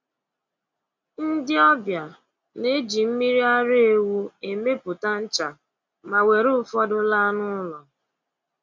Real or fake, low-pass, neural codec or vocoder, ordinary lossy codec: real; 7.2 kHz; none; MP3, 64 kbps